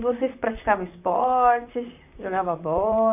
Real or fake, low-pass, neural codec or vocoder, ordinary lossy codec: fake; 3.6 kHz; vocoder, 44.1 kHz, 128 mel bands, Pupu-Vocoder; none